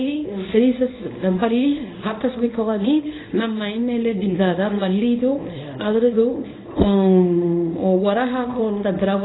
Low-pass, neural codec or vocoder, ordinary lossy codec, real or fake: 7.2 kHz; codec, 24 kHz, 0.9 kbps, WavTokenizer, small release; AAC, 16 kbps; fake